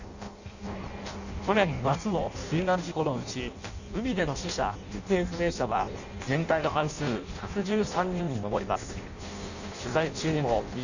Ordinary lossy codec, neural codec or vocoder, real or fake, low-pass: none; codec, 16 kHz in and 24 kHz out, 0.6 kbps, FireRedTTS-2 codec; fake; 7.2 kHz